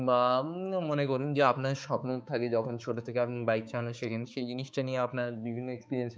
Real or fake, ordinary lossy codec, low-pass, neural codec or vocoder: fake; none; none; codec, 16 kHz, 4 kbps, X-Codec, HuBERT features, trained on balanced general audio